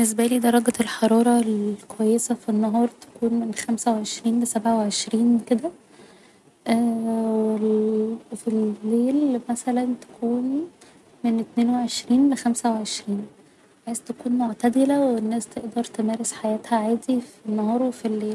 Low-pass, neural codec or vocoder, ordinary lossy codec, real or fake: none; none; none; real